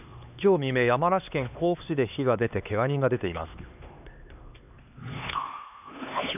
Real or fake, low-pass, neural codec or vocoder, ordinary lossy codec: fake; 3.6 kHz; codec, 16 kHz, 2 kbps, X-Codec, HuBERT features, trained on LibriSpeech; none